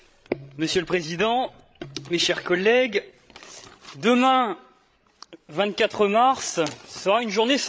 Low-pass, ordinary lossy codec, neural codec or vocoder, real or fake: none; none; codec, 16 kHz, 16 kbps, FreqCodec, larger model; fake